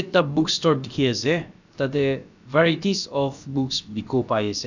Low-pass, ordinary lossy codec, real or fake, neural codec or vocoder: 7.2 kHz; none; fake; codec, 16 kHz, about 1 kbps, DyCAST, with the encoder's durations